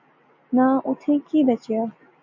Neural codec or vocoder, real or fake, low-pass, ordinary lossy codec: none; real; 7.2 kHz; MP3, 64 kbps